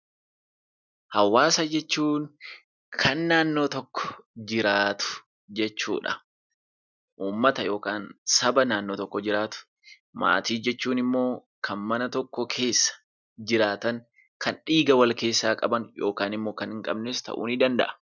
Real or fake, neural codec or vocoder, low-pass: real; none; 7.2 kHz